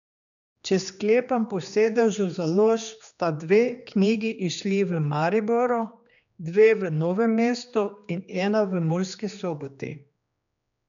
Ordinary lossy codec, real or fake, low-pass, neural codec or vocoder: none; fake; 7.2 kHz; codec, 16 kHz, 2 kbps, X-Codec, HuBERT features, trained on general audio